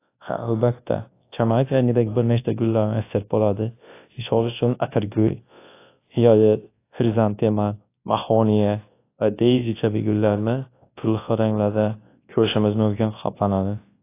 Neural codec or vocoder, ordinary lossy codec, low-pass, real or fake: codec, 24 kHz, 0.9 kbps, WavTokenizer, large speech release; AAC, 24 kbps; 3.6 kHz; fake